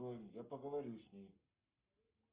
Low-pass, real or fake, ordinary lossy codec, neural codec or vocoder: 3.6 kHz; fake; Opus, 24 kbps; codec, 44.1 kHz, 7.8 kbps, Pupu-Codec